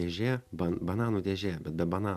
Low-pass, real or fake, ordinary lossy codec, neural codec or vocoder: 14.4 kHz; real; MP3, 96 kbps; none